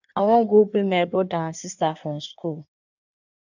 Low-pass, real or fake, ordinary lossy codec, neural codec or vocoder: 7.2 kHz; fake; none; codec, 16 kHz in and 24 kHz out, 1.1 kbps, FireRedTTS-2 codec